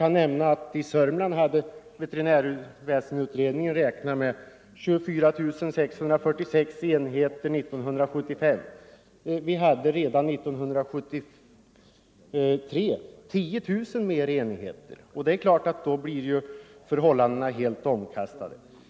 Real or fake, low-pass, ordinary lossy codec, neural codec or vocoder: real; none; none; none